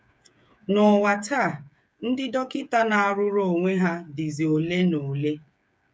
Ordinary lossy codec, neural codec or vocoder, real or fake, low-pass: none; codec, 16 kHz, 8 kbps, FreqCodec, smaller model; fake; none